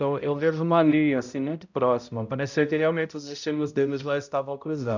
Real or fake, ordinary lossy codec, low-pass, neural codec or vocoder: fake; none; 7.2 kHz; codec, 16 kHz, 0.5 kbps, X-Codec, HuBERT features, trained on balanced general audio